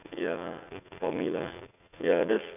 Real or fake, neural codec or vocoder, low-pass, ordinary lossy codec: fake; vocoder, 44.1 kHz, 80 mel bands, Vocos; 3.6 kHz; none